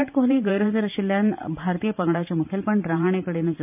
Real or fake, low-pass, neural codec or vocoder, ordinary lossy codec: fake; 3.6 kHz; vocoder, 44.1 kHz, 128 mel bands every 512 samples, BigVGAN v2; none